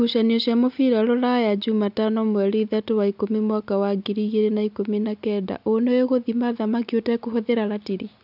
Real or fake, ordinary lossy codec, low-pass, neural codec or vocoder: real; none; 5.4 kHz; none